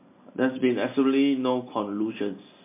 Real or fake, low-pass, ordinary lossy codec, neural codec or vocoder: real; 3.6 kHz; MP3, 24 kbps; none